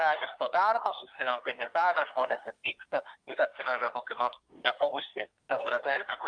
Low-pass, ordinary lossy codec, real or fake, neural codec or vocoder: 9.9 kHz; Opus, 32 kbps; fake; codec, 24 kHz, 1 kbps, SNAC